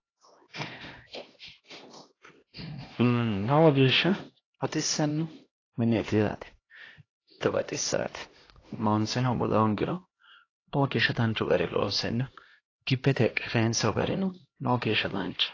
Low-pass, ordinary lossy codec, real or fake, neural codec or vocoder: 7.2 kHz; AAC, 32 kbps; fake; codec, 16 kHz, 1 kbps, X-Codec, HuBERT features, trained on LibriSpeech